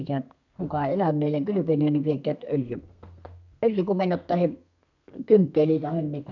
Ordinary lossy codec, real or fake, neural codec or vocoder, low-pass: none; fake; codec, 32 kHz, 1.9 kbps, SNAC; 7.2 kHz